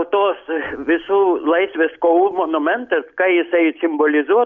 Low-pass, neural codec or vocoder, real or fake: 7.2 kHz; none; real